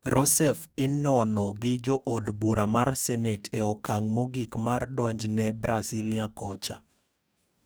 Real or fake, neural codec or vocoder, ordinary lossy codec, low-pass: fake; codec, 44.1 kHz, 2.6 kbps, DAC; none; none